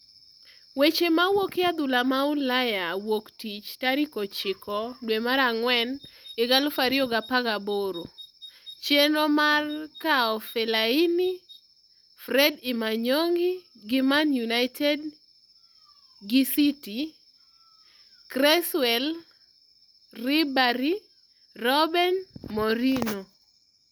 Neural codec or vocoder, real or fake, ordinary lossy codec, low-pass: none; real; none; none